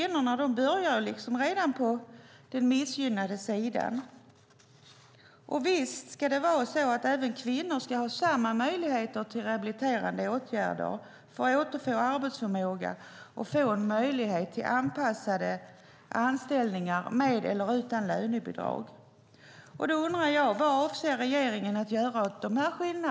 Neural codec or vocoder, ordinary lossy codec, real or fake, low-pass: none; none; real; none